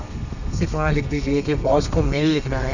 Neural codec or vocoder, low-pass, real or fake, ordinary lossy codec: codec, 32 kHz, 1.9 kbps, SNAC; 7.2 kHz; fake; none